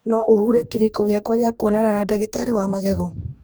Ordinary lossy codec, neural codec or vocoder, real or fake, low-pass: none; codec, 44.1 kHz, 2.6 kbps, DAC; fake; none